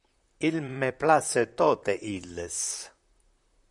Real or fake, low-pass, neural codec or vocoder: fake; 10.8 kHz; vocoder, 44.1 kHz, 128 mel bands, Pupu-Vocoder